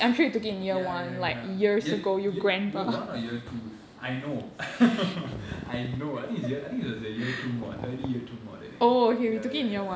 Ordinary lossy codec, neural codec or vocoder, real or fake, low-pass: none; none; real; none